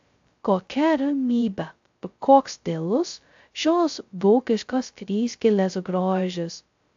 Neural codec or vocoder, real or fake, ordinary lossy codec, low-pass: codec, 16 kHz, 0.2 kbps, FocalCodec; fake; AAC, 64 kbps; 7.2 kHz